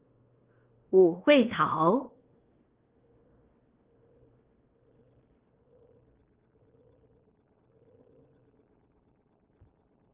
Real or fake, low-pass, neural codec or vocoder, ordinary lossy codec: fake; 3.6 kHz; codec, 16 kHz in and 24 kHz out, 0.9 kbps, LongCat-Audio-Codec, fine tuned four codebook decoder; Opus, 16 kbps